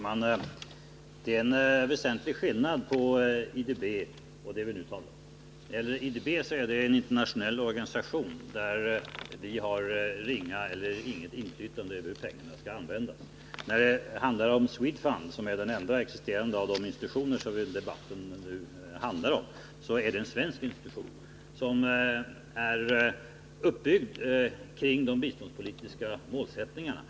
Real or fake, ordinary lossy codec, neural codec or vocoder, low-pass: real; none; none; none